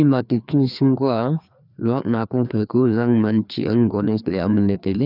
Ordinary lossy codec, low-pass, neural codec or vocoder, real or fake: none; 5.4 kHz; codec, 16 kHz, 2 kbps, FreqCodec, larger model; fake